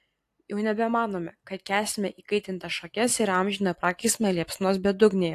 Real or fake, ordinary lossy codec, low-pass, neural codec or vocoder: real; AAC, 64 kbps; 14.4 kHz; none